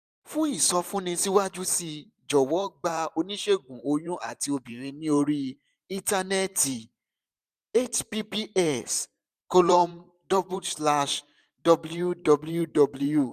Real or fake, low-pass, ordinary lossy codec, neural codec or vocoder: fake; 14.4 kHz; none; vocoder, 44.1 kHz, 128 mel bands every 512 samples, BigVGAN v2